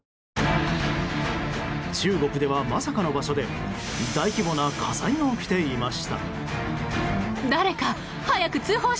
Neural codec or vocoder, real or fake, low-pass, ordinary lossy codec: none; real; none; none